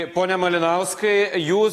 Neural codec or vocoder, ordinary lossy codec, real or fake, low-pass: none; AAC, 48 kbps; real; 14.4 kHz